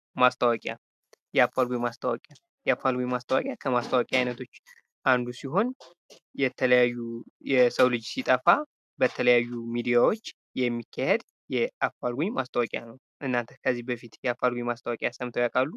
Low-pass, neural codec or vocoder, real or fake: 14.4 kHz; none; real